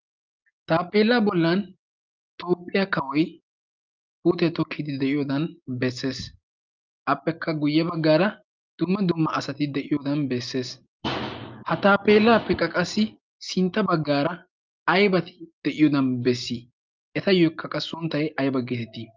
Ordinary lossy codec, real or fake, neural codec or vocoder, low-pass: Opus, 24 kbps; real; none; 7.2 kHz